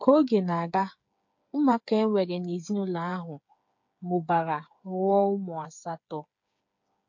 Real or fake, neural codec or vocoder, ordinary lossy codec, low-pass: fake; codec, 16 kHz, 8 kbps, FreqCodec, smaller model; MP3, 48 kbps; 7.2 kHz